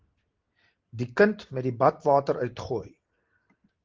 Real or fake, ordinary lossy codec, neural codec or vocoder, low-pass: real; Opus, 16 kbps; none; 7.2 kHz